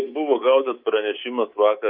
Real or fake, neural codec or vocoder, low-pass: fake; codec, 24 kHz, 3.1 kbps, DualCodec; 5.4 kHz